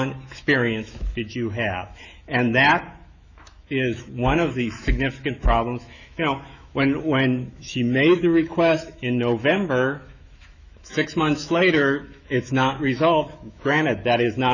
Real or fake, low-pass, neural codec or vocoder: fake; 7.2 kHz; autoencoder, 48 kHz, 128 numbers a frame, DAC-VAE, trained on Japanese speech